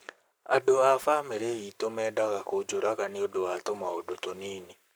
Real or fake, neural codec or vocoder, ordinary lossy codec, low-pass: fake; codec, 44.1 kHz, 7.8 kbps, Pupu-Codec; none; none